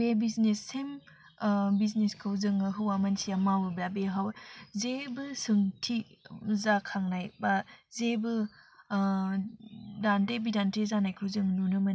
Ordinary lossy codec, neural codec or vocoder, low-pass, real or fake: none; none; none; real